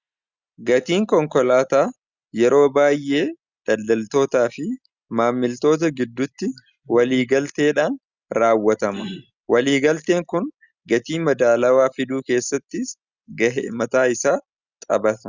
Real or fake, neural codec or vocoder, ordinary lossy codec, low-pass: real; none; Opus, 64 kbps; 7.2 kHz